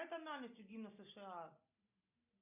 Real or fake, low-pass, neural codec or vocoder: real; 3.6 kHz; none